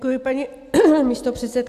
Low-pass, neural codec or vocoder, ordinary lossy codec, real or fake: 14.4 kHz; none; AAC, 96 kbps; real